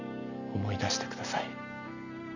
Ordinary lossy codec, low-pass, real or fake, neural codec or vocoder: none; 7.2 kHz; real; none